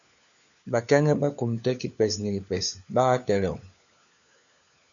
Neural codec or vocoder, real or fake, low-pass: codec, 16 kHz, 4 kbps, FunCodec, trained on LibriTTS, 50 frames a second; fake; 7.2 kHz